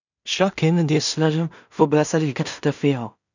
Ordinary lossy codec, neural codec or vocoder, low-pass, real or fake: none; codec, 16 kHz in and 24 kHz out, 0.4 kbps, LongCat-Audio-Codec, two codebook decoder; 7.2 kHz; fake